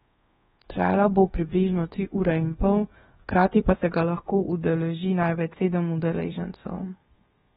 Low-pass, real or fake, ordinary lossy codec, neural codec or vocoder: 10.8 kHz; fake; AAC, 16 kbps; codec, 24 kHz, 1.2 kbps, DualCodec